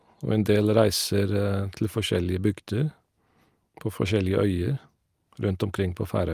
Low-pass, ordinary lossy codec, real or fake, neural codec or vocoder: 14.4 kHz; Opus, 32 kbps; real; none